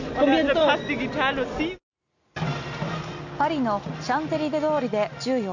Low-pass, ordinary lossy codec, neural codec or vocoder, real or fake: 7.2 kHz; none; none; real